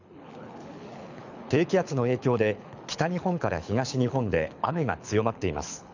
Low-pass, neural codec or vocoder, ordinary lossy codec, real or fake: 7.2 kHz; codec, 24 kHz, 6 kbps, HILCodec; none; fake